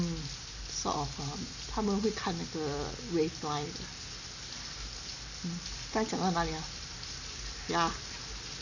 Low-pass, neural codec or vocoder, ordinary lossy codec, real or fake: 7.2 kHz; none; none; real